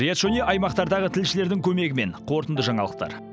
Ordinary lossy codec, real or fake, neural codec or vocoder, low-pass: none; real; none; none